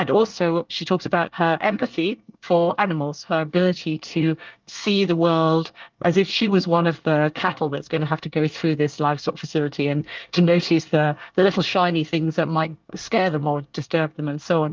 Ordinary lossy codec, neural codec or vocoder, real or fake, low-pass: Opus, 24 kbps; codec, 24 kHz, 1 kbps, SNAC; fake; 7.2 kHz